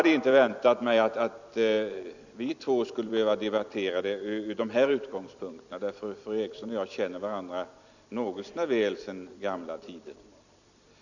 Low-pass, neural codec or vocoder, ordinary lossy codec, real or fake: 7.2 kHz; vocoder, 44.1 kHz, 128 mel bands every 256 samples, BigVGAN v2; none; fake